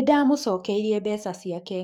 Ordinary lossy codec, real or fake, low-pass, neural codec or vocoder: none; fake; 19.8 kHz; codec, 44.1 kHz, 7.8 kbps, DAC